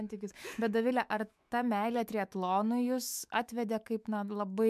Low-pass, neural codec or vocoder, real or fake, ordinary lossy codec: 14.4 kHz; autoencoder, 48 kHz, 128 numbers a frame, DAC-VAE, trained on Japanese speech; fake; MP3, 96 kbps